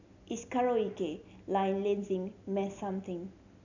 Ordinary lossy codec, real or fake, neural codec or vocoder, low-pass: none; real; none; 7.2 kHz